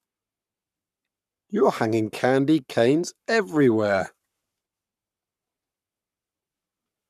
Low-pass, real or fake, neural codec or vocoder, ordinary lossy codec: 14.4 kHz; fake; codec, 44.1 kHz, 7.8 kbps, Pupu-Codec; none